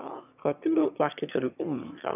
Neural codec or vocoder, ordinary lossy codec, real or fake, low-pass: autoencoder, 22.05 kHz, a latent of 192 numbers a frame, VITS, trained on one speaker; none; fake; 3.6 kHz